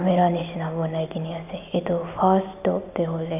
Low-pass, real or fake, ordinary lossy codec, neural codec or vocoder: 3.6 kHz; real; none; none